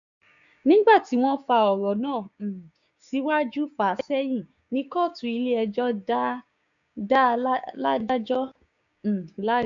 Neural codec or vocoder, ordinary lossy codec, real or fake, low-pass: codec, 16 kHz, 6 kbps, DAC; none; fake; 7.2 kHz